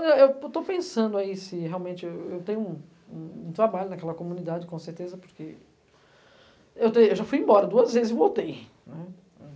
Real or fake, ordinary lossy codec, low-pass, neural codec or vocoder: real; none; none; none